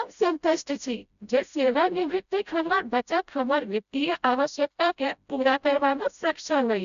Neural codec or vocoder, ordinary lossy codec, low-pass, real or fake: codec, 16 kHz, 0.5 kbps, FreqCodec, smaller model; none; 7.2 kHz; fake